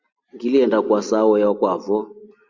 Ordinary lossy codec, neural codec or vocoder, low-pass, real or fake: Opus, 64 kbps; none; 7.2 kHz; real